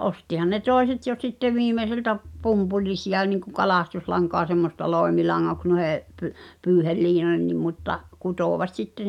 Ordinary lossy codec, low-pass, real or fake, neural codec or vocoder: none; 19.8 kHz; real; none